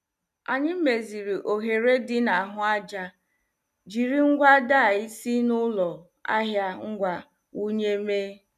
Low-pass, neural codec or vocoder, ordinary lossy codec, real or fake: 14.4 kHz; none; none; real